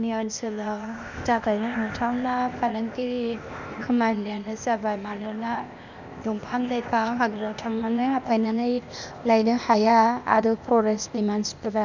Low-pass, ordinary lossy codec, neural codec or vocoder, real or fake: 7.2 kHz; none; codec, 16 kHz, 0.8 kbps, ZipCodec; fake